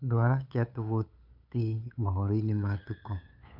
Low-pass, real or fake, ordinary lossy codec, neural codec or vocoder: 5.4 kHz; fake; none; codec, 16 kHz, 8 kbps, FunCodec, trained on LibriTTS, 25 frames a second